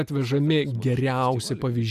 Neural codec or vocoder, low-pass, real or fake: none; 14.4 kHz; real